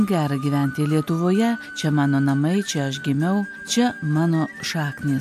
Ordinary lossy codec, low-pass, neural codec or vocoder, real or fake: MP3, 96 kbps; 14.4 kHz; none; real